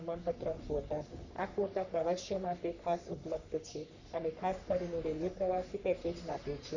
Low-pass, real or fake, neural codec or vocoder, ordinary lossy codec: 7.2 kHz; fake; codec, 44.1 kHz, 3.4 kbps, Pupu-Codec; none